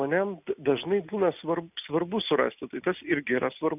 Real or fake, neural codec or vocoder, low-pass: real; none; 3.6 kHz